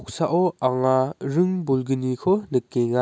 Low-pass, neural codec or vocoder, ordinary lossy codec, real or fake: none; none; none; real